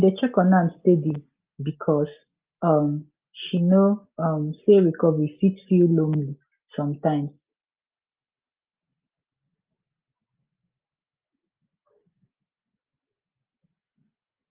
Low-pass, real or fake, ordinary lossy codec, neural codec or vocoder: 3.6 kHz; real; Opus, 24 kbps; none